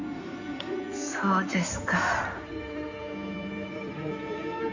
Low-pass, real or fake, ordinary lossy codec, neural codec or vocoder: 7.2 kHz; fake; none; codec, 16 kHz in and 24 kHz out, 2.2 kbps, FireRedTTS-2 codec